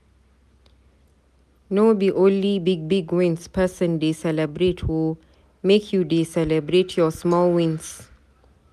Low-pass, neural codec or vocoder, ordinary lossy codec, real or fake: 14.4 kHz; none; none; real